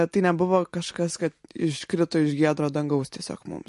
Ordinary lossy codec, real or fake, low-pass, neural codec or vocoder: MP3, 48 kbps; real; 14.4 kHz; none